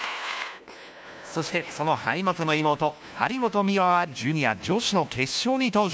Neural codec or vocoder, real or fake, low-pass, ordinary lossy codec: codec, 16 kHz, 1 kbps, FunCodec, trained on LibriTTS, 50 frames a second; fake; none; none